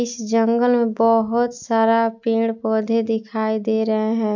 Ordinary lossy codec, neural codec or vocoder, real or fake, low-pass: MP3, 64 kbps; none; real; 7.2 kHz